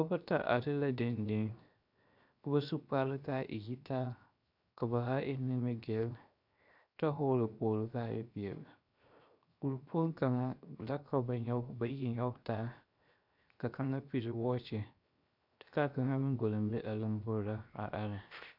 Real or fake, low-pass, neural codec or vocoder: fake; 5.4 kHz; codec, 16 kHz, 0.7 kbps, FocalCodec